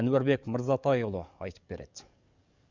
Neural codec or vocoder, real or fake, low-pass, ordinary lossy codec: codec, 44.1 kHz, 7.8 kbps, DAC; fake; 7.2 kHz; Opus, 64 kbps